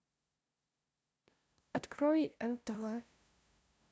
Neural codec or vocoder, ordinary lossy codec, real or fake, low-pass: codec, 16 kHz, 0.5 kbps, FunCodec, trained on LibriTTS, 25 frames a second; none; fake; none